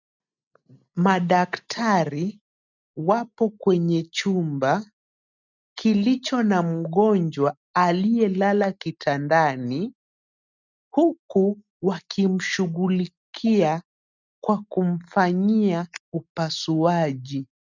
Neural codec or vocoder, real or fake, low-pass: none; real; 7.2 kHz